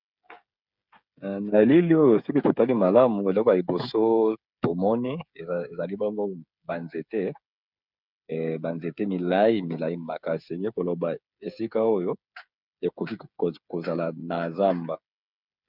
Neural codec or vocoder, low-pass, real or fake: codec, 16 kHz, 8 kbps, FreqCodec, smaller model; 5.4 kHz; fake